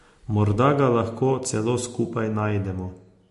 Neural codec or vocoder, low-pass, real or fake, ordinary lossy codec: none; 14.4 kHz; real; MP3, 48 kbps